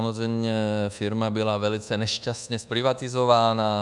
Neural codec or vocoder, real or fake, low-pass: codec, 24 kHz, 1.2 kbps, DualCodec; fake; 10.8 kHz